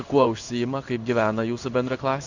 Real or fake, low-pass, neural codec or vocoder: fake; 7.2 kHz; codec, 16 kHz in and 24 kHz out, 1 kbps, XY-Tokenizer